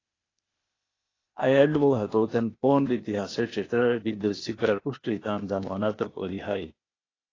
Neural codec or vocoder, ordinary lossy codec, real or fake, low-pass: codec, 16 kHz, 0.8 kbps, ZipCodec; AAC, 32 kbps; fake; 7.2 kHz